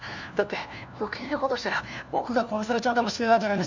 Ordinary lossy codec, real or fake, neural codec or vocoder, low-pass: none; fake; codec, 16 kHz, 1 kbps, FunCodec, trained on LibriTTS, 50 frames a second; 7.2 kHz